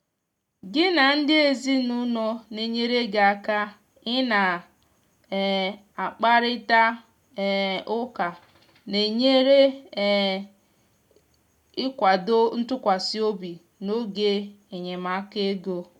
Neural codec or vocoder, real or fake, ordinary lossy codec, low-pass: none; real; none; 19.8 kHz